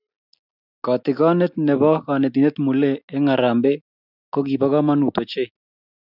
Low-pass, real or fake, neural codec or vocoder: 5.4 kHz; real; none